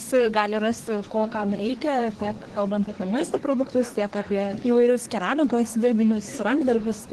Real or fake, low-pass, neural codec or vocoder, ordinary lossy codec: fake; 10.8 kHz; codec, 24 kHz, 1 kbps, SNAC; Opus, 16 kbps